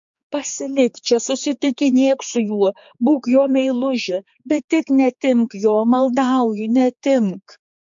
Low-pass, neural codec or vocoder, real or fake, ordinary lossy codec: 7.2 kHz; codec, 16 kHz, 4 kbps, X-Codec, HuBERT features, trained on general audio; fake; MP3, 48 kbps